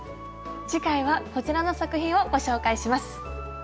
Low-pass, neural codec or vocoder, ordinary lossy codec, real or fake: none; none; none; real